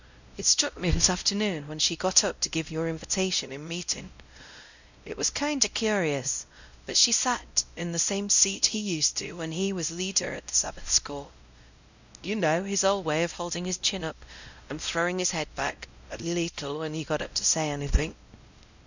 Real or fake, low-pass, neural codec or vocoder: fake; 7.2 kHz; codec, 16 kHz, 0.5 kbps, X-Codec, WavLM features, trained on Multilingual LibriSpeech